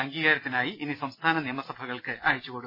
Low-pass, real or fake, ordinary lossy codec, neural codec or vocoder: 5.4 kHz; real; MP3, 24 kbps; none